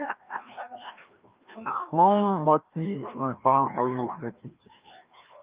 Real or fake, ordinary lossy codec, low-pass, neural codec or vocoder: fake; Opus, 32 kbps; 3.6 kHz; codec, 16 kHz, 1 kbps, FreqCodec, larger model